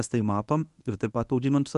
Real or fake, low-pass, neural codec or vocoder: fake; 10.8 kHz; codec, 24 kHz, 0.9 kbps, WavTokenizer, medium speech release version 1